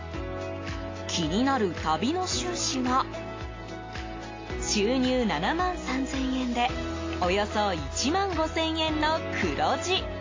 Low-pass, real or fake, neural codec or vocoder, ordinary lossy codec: 7.2 kHz; real; none; AAC, 32 kbps